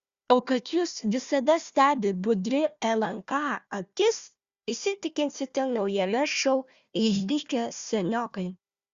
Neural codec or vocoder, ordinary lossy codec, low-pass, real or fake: codec, 16 kHz, 1 kbps, FunCodec, trained on Chinese and English, 50 frames a second; Opus, 64 kbps; 7.2 kHz; fake